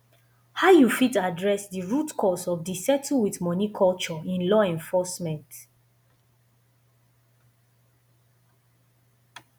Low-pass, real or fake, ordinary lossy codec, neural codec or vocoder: none; real; none; none